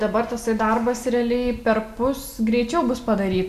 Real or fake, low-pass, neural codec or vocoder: real; 14.4 kHz; none